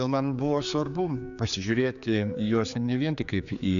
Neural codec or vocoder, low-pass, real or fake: codec, 16 kHz, 4 kbps, X-Codec, HuBERT features, trained on general audio; 7.2 kHz; fake